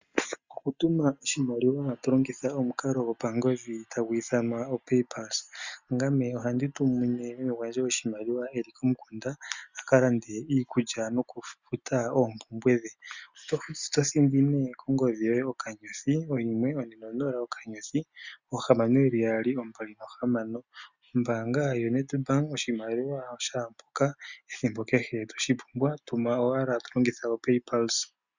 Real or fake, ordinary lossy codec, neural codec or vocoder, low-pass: real; Opus, 64 kbps; none; 7.2 kHz